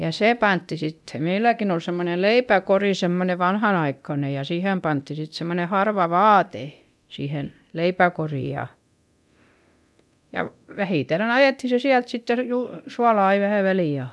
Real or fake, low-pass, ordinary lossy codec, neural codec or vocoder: fake; none; none; codec, 24 kHz, 0.9 kbps, DualCodec